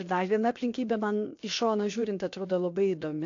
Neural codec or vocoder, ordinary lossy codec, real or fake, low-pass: codec, 16 kHz, 0.7 kbps, FocalCodec; AAC, 48 kbps; fake; 7.2 kHz